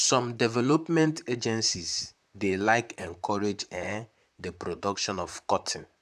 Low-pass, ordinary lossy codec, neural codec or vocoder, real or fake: 14.4 kHz; none; vocoder, 44.1 kHz, 128 mel bands, Pupu-Vocoder; fake